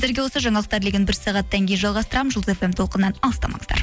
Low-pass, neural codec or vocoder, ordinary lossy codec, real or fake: none; none; none; real